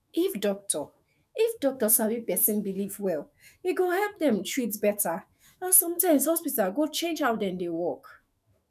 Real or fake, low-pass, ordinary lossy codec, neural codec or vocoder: fake; 14.4 kHz; none; autoencoder, 48 kHz, 128 numbers a frame, DAC-VAE, trained on Japanese speech